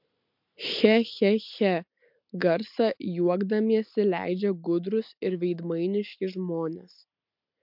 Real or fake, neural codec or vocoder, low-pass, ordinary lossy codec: real; none; 5.4 kHz; MP3, 48 kbps